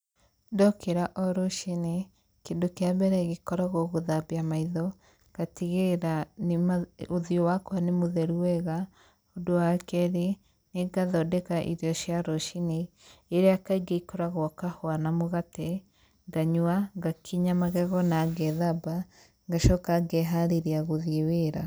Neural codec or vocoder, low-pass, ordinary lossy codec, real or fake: none; none; none; real